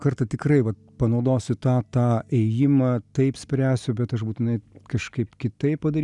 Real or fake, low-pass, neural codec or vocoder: real; 10.8 kHz; none